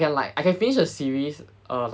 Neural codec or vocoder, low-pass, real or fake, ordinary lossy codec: none; none; real; none